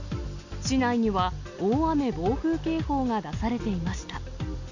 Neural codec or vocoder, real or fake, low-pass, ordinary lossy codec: none; real; 7.2 kHz; none